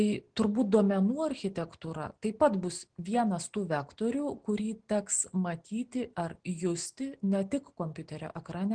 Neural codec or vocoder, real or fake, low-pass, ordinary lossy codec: none; real; 9.9 kHz; Opus, 16 kbps